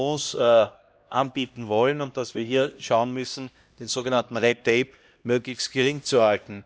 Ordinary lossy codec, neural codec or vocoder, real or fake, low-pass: none; codec, 16 kHz, 1 kbps, X-Codec, HuBERT features, trained on LibriSpeech; fake; none